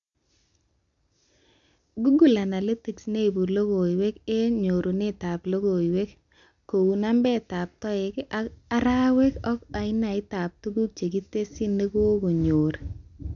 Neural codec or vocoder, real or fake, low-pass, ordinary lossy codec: none; real; 7.2 kHz; none